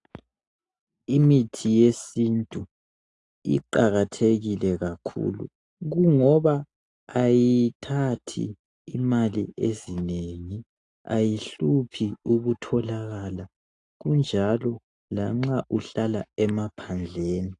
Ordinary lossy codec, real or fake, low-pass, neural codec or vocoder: AAC, 48 kbps; real; 10.8 kHz; none